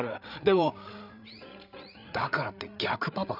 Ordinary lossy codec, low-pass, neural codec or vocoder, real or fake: none; 5.4 kHz; codec, 16 kHz, 8 kbps, FreqCodec, larger model; fake